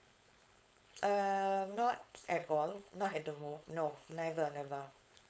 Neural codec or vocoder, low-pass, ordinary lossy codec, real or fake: codec, 16 kHz, 4.8 kbps, FACodec; none; none; fake